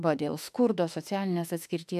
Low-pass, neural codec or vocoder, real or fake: 14.4 kHz; autoencoder, 48 kHz, 32 numbers a frame, DAC-VAE, trained on Japanese speech; fake